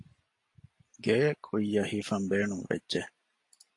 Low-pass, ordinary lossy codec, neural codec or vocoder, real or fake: 10.8 kHz; AAC, 48 kbps; none; real